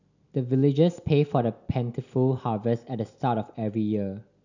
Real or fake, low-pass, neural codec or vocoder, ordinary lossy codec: real; 7.2 kHz; none; none